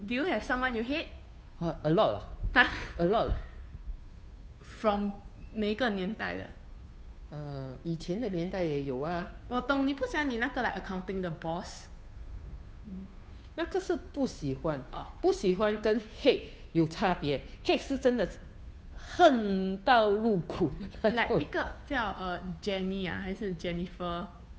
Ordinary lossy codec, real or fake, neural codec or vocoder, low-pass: none; fake; codec, 16 kHz, 2 kbps, FunCodec, trained on Chinese and English, 25 frames a second; none